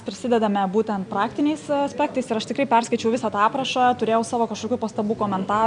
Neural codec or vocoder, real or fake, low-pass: none; real; 9.9 kHz